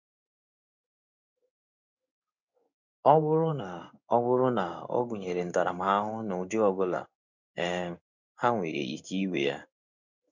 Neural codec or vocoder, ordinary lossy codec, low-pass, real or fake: codec, 16 kHz in and 24 kHz out, 1 kbps, XY-Tokenizer; none; 7.2 kHz; fake